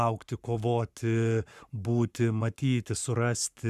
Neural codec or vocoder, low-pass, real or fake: codec, 44.1 kHz, 7.8 kbps, Pupu-Codec; 14.4 kHz; fake